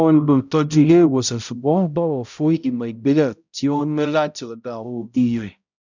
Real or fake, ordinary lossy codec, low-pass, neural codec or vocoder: fake; none; 7.2 kHz; codec, 16 kHz, 0.5 kbps, X-Codec, HuBERT features, trained on balanced general audio